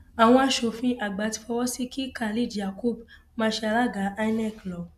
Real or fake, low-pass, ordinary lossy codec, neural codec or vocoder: real; 14.4 kHz; none; none